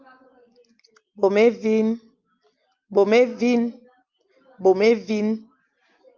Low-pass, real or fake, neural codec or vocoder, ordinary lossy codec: 7.2 kHz; real; none; Opus, 32 kbps